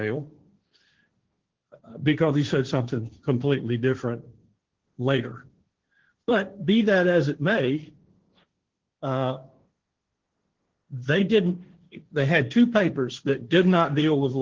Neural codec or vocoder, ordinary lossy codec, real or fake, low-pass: codec, 16 kHz, 1.1 kbps, Voila-Tokenizer; Opus, 16 kbps; fake; 7.2 kHz